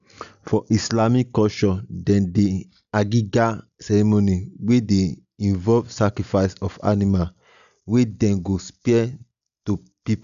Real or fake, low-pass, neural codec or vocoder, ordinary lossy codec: real; 7.2 kHz; none; none